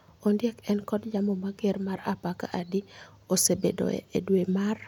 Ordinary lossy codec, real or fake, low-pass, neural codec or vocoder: none; real; 19.8 kHz; none